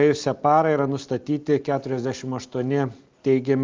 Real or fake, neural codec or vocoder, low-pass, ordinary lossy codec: real; none; 7.2 kHz; Opus, 32 kbps